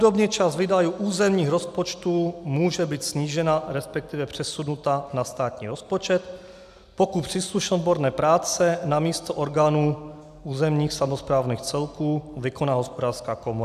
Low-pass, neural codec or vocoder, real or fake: 14.4 kHz; none; real